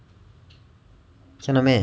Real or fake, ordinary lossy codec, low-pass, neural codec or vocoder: real; none; none; none